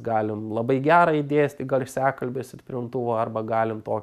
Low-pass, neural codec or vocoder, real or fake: 14.4 kHz; none; real